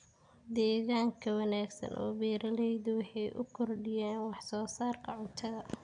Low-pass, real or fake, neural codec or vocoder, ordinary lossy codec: 9.9 kHz; real; none; MP3, 96 kbps